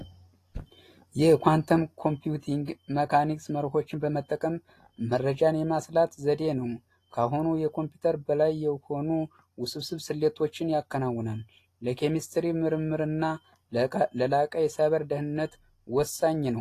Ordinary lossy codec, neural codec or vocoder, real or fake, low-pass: AAC, 48 kbps; none; real; 14.4 kHz